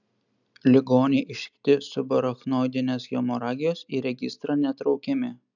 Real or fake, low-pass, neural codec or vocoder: real; 7.2 kHz; none